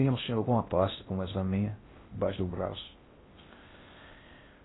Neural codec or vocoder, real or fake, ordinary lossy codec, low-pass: codec, 16 kHz in and 24 kHz out, 0.6 kbps, FocalCodec, streaming, 4096 codes; fake; AAC, 16 kbps; 7.2 kHz